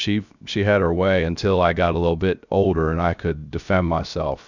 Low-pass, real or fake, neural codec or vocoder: 7.2 kHz; fake; codec, 16 kHz, 0.7 kbps, FocalCodec